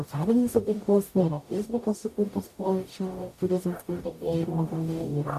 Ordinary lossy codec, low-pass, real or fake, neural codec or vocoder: MP3, 64 kbps; 14.4 kHz; fake; codec, 44.1 kHz, 0.9 kbps, DAC